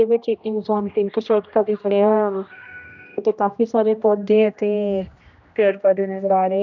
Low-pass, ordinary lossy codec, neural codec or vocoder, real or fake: 7.2 kHz; none; codec, 16 kHz, 1 kbps, X-Codec, HuBERT features, trained on general audio; fake